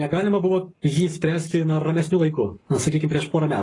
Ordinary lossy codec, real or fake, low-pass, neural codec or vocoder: AAC, 32 kbps; fake; 10.8 kHz; codec, 44.1 kHz, 7.8 kbps, Pupu-Codec